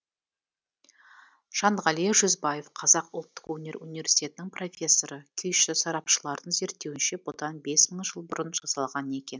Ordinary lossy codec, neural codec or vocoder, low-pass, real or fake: none; none; none; real